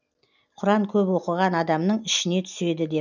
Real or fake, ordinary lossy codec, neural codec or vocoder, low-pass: real; none; none; 7.2 kHz